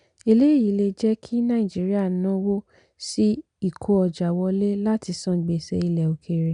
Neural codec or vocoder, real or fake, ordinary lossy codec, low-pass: none; real; none; 9.9 kHz